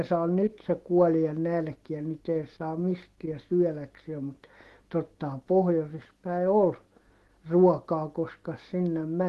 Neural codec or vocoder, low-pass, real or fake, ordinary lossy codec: autoencoder, 48 kHz, 128 numbers a frame, DAC-VAE, trained on Japanese speech; 14.4 kHz; fake; Opus, 16 kbps